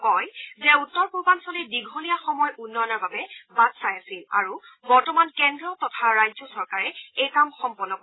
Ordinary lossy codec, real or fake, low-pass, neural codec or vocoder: AAC, 16 kbps; real; 7.2 kHz; none